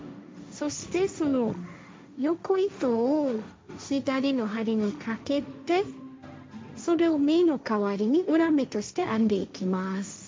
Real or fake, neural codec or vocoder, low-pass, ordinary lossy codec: fake; codec, 16 kHz, 1.1 kbps, Voila-Tokenizer; none; none